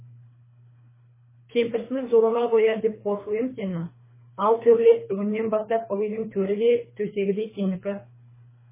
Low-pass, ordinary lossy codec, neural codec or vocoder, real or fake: 3.6 kHz; MP3, 16 kbps; codec, 24 kHz, 3 kbps, HILCodec; fake